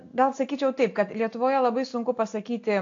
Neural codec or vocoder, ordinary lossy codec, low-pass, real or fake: none; AAC, 48 kbps; 7.2 kHz; real